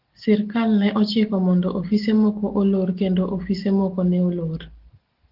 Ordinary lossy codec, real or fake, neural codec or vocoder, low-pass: Opus, 16 kbps; real; none; 5.4 kHz